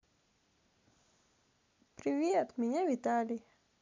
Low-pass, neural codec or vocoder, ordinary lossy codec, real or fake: 7.2 kHz; none; MP3, 64 kbps; real